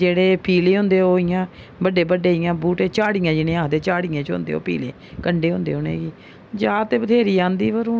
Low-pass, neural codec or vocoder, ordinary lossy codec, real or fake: none; none; none; real